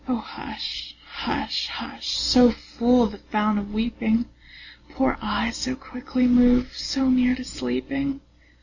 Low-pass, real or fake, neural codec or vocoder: 7.2 kHz; real; none